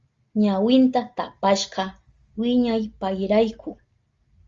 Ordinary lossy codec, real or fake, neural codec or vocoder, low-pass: Opus, 32 kbps; real; none; 7.2 kHz